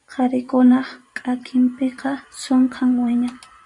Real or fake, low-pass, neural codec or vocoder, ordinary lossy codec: fake; 10.8 kHz; vocoder, 44.1 kHz, 128 mel bands every 512 samples, BigVGAN v2; AAC, 64 kbps